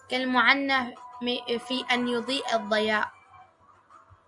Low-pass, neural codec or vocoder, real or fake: 10.8 kHz; none; real